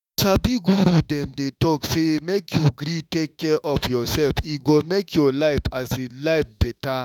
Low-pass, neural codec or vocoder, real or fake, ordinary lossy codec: 19.8 kHz; autoencoder, 48 kHz, 32 numbers a frame, DAC-VAE, trained on Japanese speech; fake; none